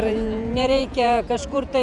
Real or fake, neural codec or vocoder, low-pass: fake; vocoder, 48 kHz, 128 mel bands, Vocos; 10.8 kHz